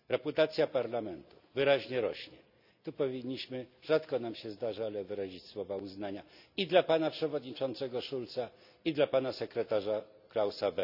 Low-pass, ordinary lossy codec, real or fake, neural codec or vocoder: 5.4 kHz; none; real; none